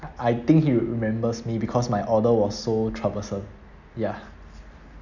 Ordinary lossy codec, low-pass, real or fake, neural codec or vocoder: none; 7.2 kHz; real; none